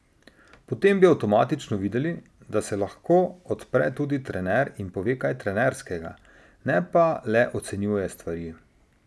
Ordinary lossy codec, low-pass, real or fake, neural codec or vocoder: none; none; real; none